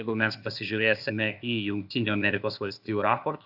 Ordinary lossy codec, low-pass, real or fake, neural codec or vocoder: AAC, 48 kbps; 5.4 kHz; fake; codec, 16 kHz, 0.8 kbps, ZipCodec